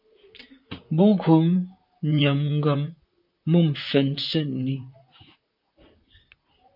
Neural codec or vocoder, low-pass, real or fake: vocoder, 44.1 kHz, 128 mel bands, Pupu-Vocoder; 5.4 kHz; fake